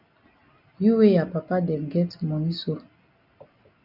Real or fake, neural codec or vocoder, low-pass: real; none; 5.4 kHz